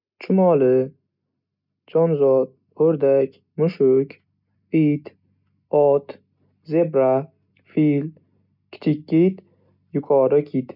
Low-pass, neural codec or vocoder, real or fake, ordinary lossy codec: 5.4 kHz; none; real; none